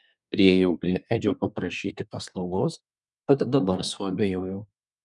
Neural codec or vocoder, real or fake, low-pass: codec, 24 kHz, 1 kbps, SNAC; fake; 10.8 kHz